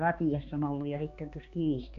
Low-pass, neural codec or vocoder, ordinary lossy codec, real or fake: 7.2 kHz; codec, 16 kHz, 2 kbps, X-Codec, HuBERT features, trained on balanced general audio; none; fake